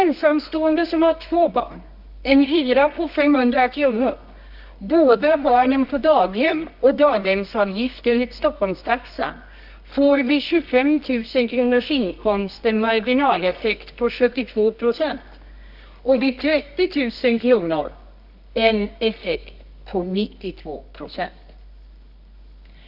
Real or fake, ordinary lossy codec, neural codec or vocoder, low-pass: fake; none; codec, 24 kHz, 0.9 kbps, WavTokenizer, medium music audio release; 5.4 kHz